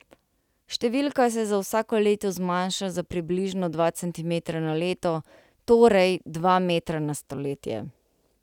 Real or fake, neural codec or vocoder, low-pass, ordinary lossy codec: real; none; 19.8 kHz; none